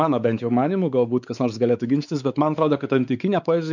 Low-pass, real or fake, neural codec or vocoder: 7.2 kHz; fake; codec, 16 kHz, 4 kbps, X-Codec, WavLM features, trained on Multilingual LibriSpeech